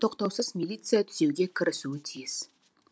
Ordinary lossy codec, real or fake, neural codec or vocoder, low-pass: none; real; none; none